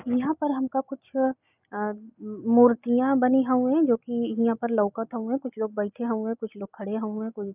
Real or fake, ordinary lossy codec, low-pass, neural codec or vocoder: real; none; 3.6 kHz; none